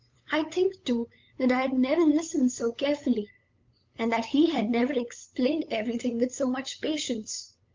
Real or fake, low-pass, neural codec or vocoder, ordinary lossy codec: fake; 7.2 kHz; codec, 16 kHz, 8 kbps, FunCodec, trained on LibriTTS, 25 frames a second; Opus, 24 kbps